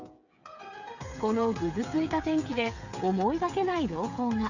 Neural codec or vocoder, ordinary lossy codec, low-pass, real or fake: codec, 16 kHz, 8 kbps, FreqCodec, smaller model; none; 7.2 kHz; fake